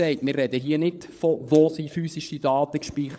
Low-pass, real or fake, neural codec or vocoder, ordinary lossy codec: none; fake; codec, 16 kHz, 16 kbps, FunCodec, trained on LibriTTS, 50 frames a second; none